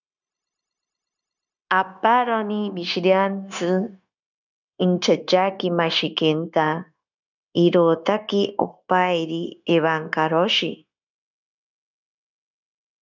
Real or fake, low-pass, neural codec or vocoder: fake; 7.2 kHz; codec, 16 kHz, 0.9 kbps, LongCat-Audio-Codec